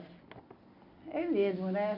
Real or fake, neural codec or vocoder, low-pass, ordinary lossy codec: real; none; 5.4 kHz; none